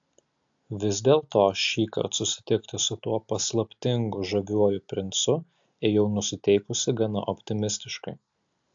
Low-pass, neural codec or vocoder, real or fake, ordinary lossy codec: 7.2 kHz; none; real; AAC, 64 kbps